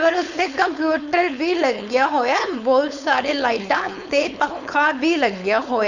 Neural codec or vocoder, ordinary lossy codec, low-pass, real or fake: codec, 16 kHz, 4.8 kbps, FACodec; none; 7.2 kHz; fake